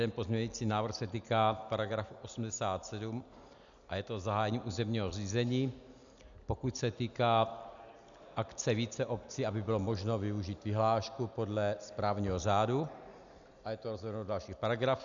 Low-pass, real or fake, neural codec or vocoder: 7.2 kHz; real; none